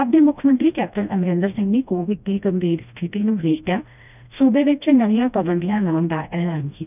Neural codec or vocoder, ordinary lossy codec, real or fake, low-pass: codec, 16 kHz, 1 kbps, FreqCodec, smaller model; none; fake; 3.6 kHz